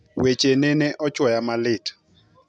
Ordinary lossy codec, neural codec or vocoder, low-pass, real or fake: none; none; none; real